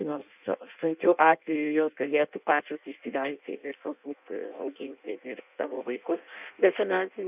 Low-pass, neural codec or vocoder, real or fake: 3.6 kHz; codec, 16 kHz in and 24 kHz out, 0.6 kbps, FireRedTTS-2 codec; fake